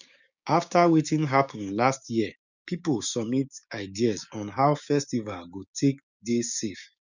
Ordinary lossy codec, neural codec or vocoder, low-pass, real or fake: none; codec, 16 kHz, 6 kbps, DAC; 7.2 kHz; fake